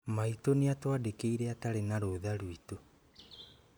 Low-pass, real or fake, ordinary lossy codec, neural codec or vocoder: none; real; none; none